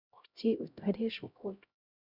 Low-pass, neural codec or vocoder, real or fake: 5.4 kHz; codec, 16 kHz, 0.5 kbps, X-Codec, HuBERT features, trained on LibriSpeech; fake